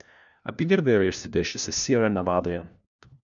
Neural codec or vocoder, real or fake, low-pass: codec, 16 kHz, 1 kbps, FunCodec, trained on LibriTTS, 50 frames a second; fake; 7.2 kHz